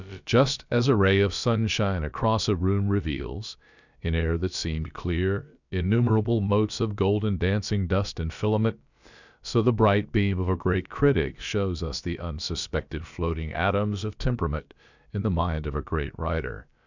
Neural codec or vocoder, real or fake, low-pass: codec, 16 kHz, about 1 kbps, DyCAST, with the encoder's durations; fake; 7.2 kHz